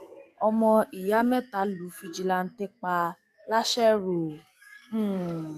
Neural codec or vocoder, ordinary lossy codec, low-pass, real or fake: codec, 44.1 kHz, 7.8 kbps, DAC; none; 14.4 kHz; fake